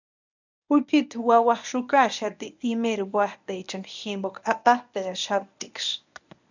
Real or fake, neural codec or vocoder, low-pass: fake; codec, 24 kHz, 0.9 kbps, WavTokenizer, medium speech release version 1; 7.2 kHz